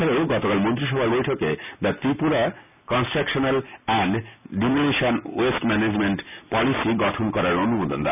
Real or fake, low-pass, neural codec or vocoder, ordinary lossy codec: real; 3.6 kHz; none; none